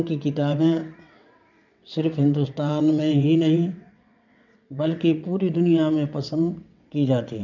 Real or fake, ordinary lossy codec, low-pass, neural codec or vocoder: fake; none; 7.2 kHz; vocoder, 22.05 kHz, 80 mel bands, WaveNeXt